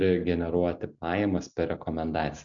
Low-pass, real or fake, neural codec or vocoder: 7.2 kHz; real; none